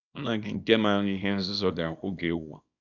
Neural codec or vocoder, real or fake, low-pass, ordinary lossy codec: codec, 24 kHz, 0.9 kbps, WavTokenizer, small release; fake; 7.2 kHz; none